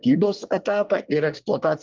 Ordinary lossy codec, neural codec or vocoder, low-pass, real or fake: Opus, 32 kbps; codec, 24 kHz, 1 kbps, SNAC; 7.2 kHz; fake